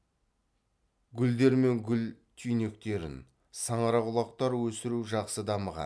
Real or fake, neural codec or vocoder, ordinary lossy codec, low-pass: real; none; none; none